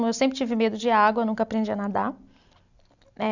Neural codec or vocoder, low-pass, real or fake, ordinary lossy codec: none; 7.2 kHz; real; none